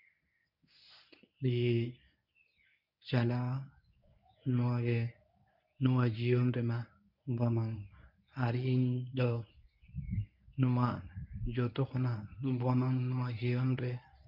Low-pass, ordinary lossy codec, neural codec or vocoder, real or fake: 5.4 kHz; none; codec, 24 kHz, 0.9 kbps, WavTokenizer, medium speech release version 1; fake